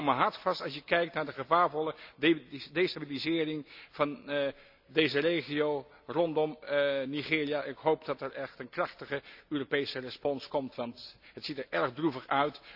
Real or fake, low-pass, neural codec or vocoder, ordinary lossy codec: real; 5.4 kHz; none; none